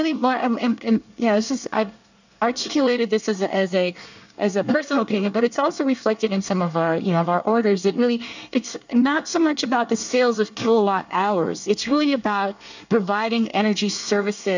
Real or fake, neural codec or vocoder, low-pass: fake; codec, 24 kHz, 1 kbps, SNAC; 7.2 kHz